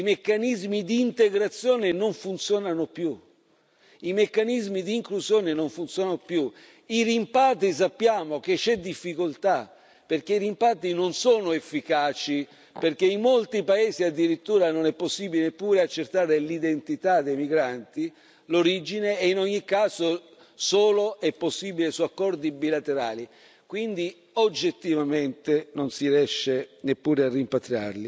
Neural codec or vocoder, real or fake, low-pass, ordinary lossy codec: none; real; none; none